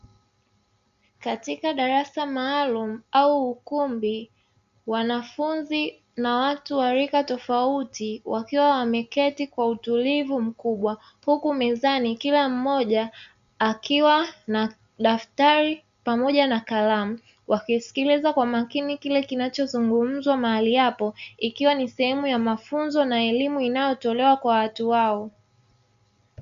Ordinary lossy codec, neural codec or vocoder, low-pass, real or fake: Opus, 64 kbps; none; 7.2 kHz; real